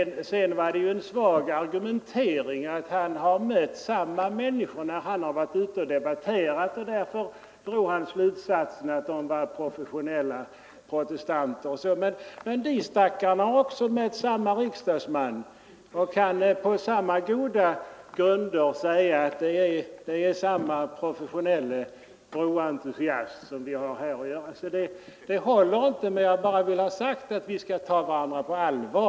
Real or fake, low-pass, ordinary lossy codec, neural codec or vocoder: real; none; none; none